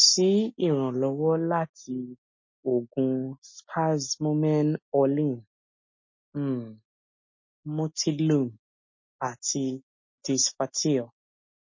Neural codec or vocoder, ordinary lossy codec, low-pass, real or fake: none; MP3, 32 kbps; 7.2 kHz; real